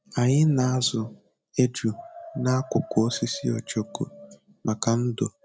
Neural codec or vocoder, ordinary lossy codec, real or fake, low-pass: none; none; real; none